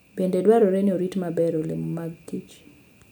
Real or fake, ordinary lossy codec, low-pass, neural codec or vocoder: real; none; none; none